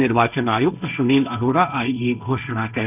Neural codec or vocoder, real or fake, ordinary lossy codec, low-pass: codec, 16 kHz, 1.1 kbps, Voila-Tokenizer; fake; none; 3.6 kHz